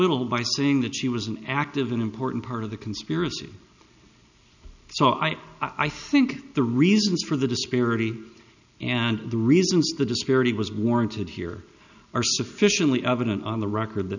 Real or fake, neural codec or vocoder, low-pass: real; none; 7.2 kHz